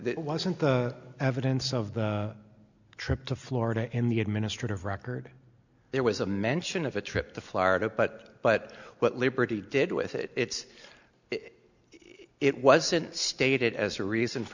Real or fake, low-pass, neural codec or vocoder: real; 7.2 kHz; none